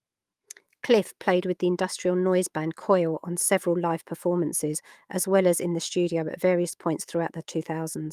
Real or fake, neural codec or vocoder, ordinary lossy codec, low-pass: fake; autoencoder, 48 kHz, 128 numbers a frame, DAC-VAE, trained on Japanese speech; Opus, 32 kbps; 14.4 kHz